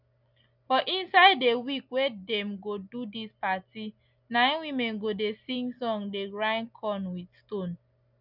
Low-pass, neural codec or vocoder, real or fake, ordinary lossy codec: 5.4 kHz; none; real; none